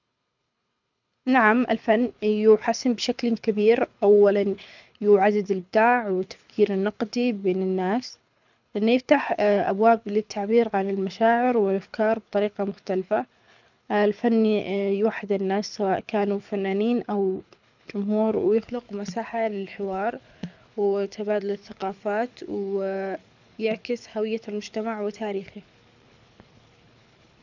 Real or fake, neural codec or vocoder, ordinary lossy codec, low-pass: fake; codec, 24 kHz, 6 kbps, HILCodec; none; 7.2 kHz